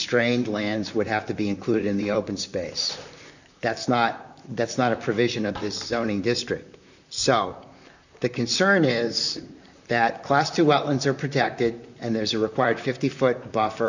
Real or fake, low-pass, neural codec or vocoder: fake; 7.2 kHz; vocoder, 44.1 kHz, 128 mel bands, Pupu-Vocoder